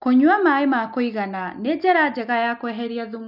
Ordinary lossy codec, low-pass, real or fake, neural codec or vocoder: none; 5.4 kHz; real; none